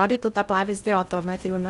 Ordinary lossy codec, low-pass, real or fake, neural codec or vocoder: Opus, 64 kbps; 10.8 kHz; fake; codec, 16 kHz in and 24 kHz out, 0.6 kbps, FocalCodec, streaming, 2048 codes